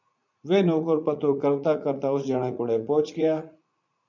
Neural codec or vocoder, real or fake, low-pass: vocoder, 44.1 kHz, 80 mel bands, Vocos; fake; 7.2 kHz